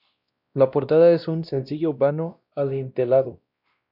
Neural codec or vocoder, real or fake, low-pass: codec, 16 kHz, 1 kbps, X-Codec, WavLM features, trained on Multilingual LibriSpeech; fake; 5.4 kHz